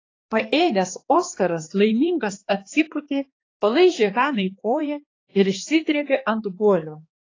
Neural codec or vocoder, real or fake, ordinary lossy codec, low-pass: codec, 16 kHz, 2 kbps, X-Codec, HuBERT features, trained on balanced general audio; fake; AAC, 32 kbps; 7.2 kHz